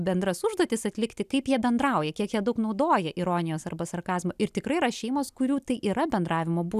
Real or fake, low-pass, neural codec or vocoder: real; 14.4 kHz; none